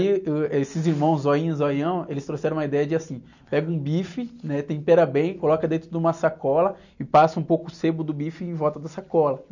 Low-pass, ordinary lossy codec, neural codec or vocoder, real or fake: 7.2 kHz; none; none; real